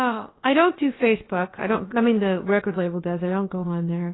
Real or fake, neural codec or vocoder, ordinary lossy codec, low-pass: fake; codec, 16 kHz, about 1 kbps, DyCAST, with the encoder's durations; AAC, 16 kbps; 7.2 kHz